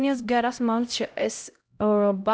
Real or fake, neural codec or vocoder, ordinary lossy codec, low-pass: fake; codec, 16 kHz, 0.5 kbps, X-Codec, HuBERT features, trained on LibriSpeech; none; none